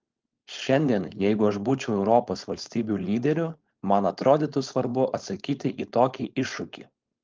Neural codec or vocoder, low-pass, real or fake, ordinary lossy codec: codec, 16 kHz, 4.8 kbps, FACodec; 7.2 kHz; fake; Opus, 32 kbps